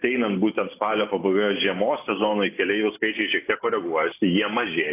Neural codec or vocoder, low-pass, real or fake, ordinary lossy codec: none; 3.6 kHz; real; AAC, 24 kbps